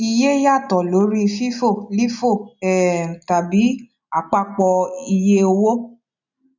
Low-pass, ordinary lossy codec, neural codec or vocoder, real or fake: 7.2 kHz; none; none; real